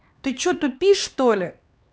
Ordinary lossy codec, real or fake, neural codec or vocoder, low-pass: none; fake; codec, 16 kHz, 2 kbps, X-Codec, HuBERT features, trained on LibriSpeech; none